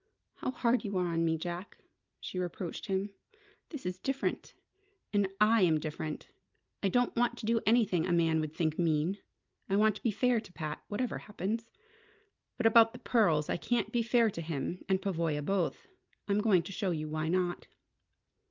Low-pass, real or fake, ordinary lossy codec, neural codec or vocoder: 7.2 kHz; real; Opus, 32 kbps; none